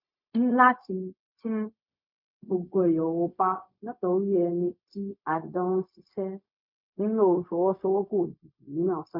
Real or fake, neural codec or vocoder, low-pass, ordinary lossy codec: fake; codec, 16 kHz, 0.4 kbps, LongCat-Audio-Codec; 5.4 kHz; MP3, 48 kbps